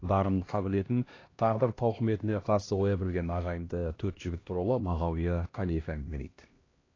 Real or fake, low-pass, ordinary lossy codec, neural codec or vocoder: fake; 7.2 kHz; AAC, 32 kbps; codec, 16 kHz, 1 kbps, X-Codec, HuBERT features, trained on LibriSpeech